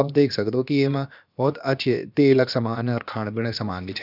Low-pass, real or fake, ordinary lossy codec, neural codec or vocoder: 5.4 kHz; fake; none; codec, 16 kHz, about 1 kbps, DyCAST, with the encoder's durations